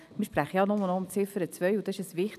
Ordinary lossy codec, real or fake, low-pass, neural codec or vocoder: none; real; 14.4 kHz; none